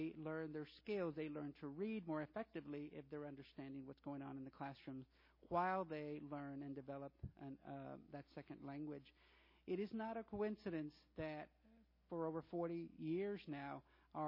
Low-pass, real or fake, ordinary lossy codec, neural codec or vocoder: 5.4 kHz; real; MP3, 24 kbps; none